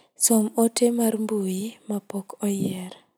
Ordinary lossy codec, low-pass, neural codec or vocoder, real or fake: none; none; none; real